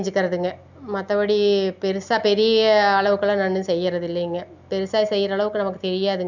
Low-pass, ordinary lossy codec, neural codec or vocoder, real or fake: 7.2 kHz; none; none; real